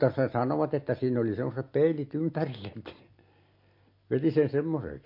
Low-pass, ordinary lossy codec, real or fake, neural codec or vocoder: 5.4 kHz; MP3, 32 kbps; real; none